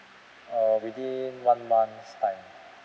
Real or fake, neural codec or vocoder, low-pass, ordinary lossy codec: real; none; none; none